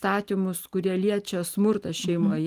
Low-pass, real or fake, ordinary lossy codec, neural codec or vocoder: 14.4 kHz; real; Opus, 32 kbps; none